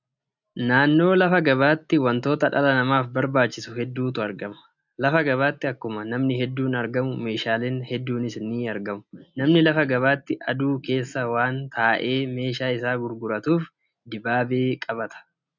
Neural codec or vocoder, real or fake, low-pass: none; real; 7.2 kHz